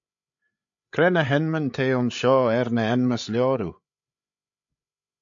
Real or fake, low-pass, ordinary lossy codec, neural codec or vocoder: fake; 7.2 kHz; AAC, 64 kbps; codec, 16 kHz, 8 kbps, FreqCodec, larger model